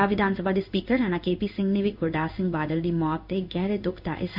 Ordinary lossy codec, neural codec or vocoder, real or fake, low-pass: none; codec, 16 kHz in and 24 kHz out, 1 kbps, XY-Tokenizer; fake; 5.4 kHz